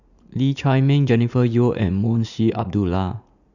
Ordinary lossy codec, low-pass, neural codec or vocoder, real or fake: none; 7.2 kHz; vocoder, 44.1 kHz, 80 mel bands, Vocos; fake